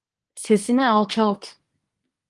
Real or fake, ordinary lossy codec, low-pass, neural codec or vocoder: fake; Opus, 24 kbps; 10.8 kHz; codec, 24 kHz, 1 kbps, SNAC